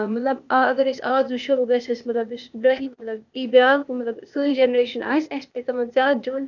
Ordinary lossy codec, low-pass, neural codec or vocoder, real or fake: none; 7.2 kHz; codec, 16 kHz, 0.8 kbps, ZipCodec; fake